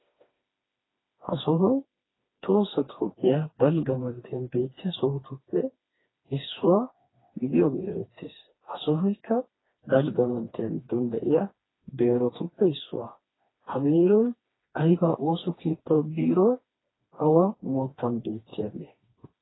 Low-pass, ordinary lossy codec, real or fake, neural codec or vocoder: 7.2 kHz; AAC, 16 kbps; fake; codec, 16 kHz, 2 kbps, FreqCodec, smaller model